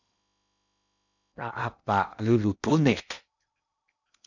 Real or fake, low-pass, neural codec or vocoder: fake; 7.2 kHz; codec, 16 kHz in and 24 kHz out, 0.8 kbps, FocalCodec, streaming, 65536 codes